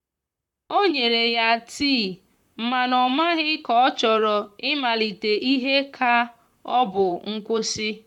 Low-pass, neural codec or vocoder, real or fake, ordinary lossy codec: 19.8 kHz; vocoder, 44.1 kHz, 128 mel bands, Pupu-Vocoder; fake; none